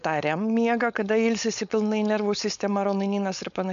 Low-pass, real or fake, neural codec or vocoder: 7.2 kHz; fake; codec, 16 kHz, 4.8 kbps, FACodec